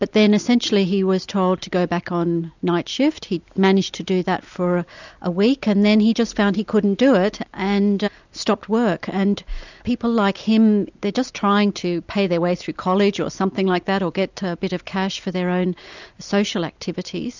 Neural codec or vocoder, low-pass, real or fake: none; 7.2 kHz; real